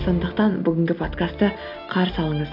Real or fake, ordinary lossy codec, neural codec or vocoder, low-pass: real; none; none; 5.4 kHz